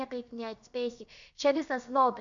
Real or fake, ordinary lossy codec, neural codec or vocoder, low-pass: fake; MP3, 96 kbps; codec, 16 kHz, about 1 kbps, DyCAST, with the encoder's durations; 7.2 kHz